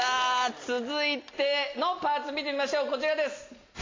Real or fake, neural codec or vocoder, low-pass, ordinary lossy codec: real; none; 7.2 kHz; AAC, 32 kbps